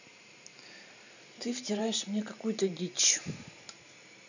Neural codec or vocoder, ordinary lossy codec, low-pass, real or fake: vocoder, 44.1 kHz, 128 mel bands every 512 samples, BigVGAN v2; none; 7.2 kHz; fake